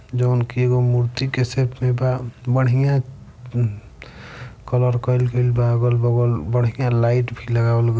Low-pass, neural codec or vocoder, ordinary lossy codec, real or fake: none; none; none; real